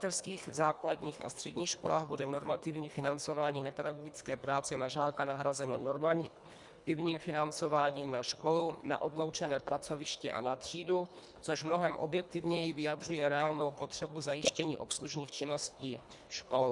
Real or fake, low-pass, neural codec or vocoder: fake; 10.8 kHz; codec, 24 kHz, 1.5 kbps, HILCodec